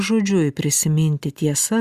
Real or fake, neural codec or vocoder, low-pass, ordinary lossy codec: real; none; 14.4 kHz; Opus, 64 kbps